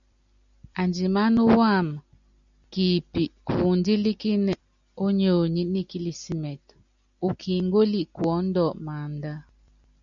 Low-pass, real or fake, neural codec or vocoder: 7.2 kHz; real; none